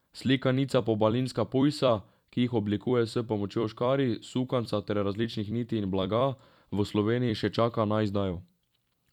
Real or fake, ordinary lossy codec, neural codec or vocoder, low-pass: fake; none; vocoder, 44.1 kHz, 128 mel bands every 256 samples, BigVGAN v2; 19.8 kHz